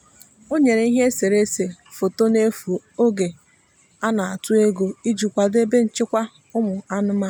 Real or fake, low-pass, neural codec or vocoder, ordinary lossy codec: real; none; none; none